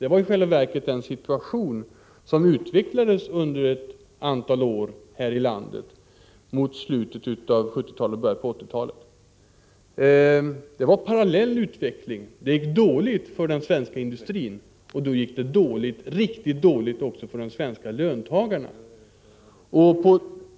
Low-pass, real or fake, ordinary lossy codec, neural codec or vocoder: none; real; none; none